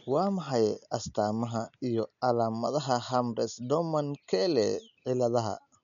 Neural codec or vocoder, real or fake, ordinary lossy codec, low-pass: none; real; none; 7.2 kHz